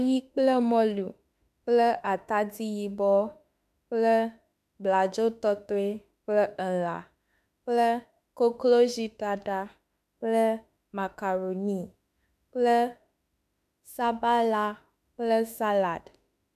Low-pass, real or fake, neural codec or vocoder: 14.4 kHz; fake; autoencoder, 48 kHz, 32 numbers a frame, DAC-VAE, trained on Japanese speech